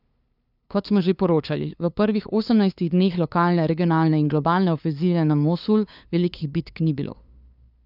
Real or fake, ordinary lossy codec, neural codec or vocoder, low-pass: fake; none; codec, 16 kHz, 2 kbps, FunCodec, trained on LibriTTS, 25 frames a second; 5.4 kHz